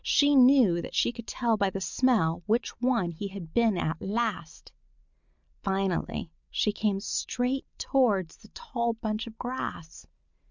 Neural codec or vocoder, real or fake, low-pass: none; real; 7.2 kHz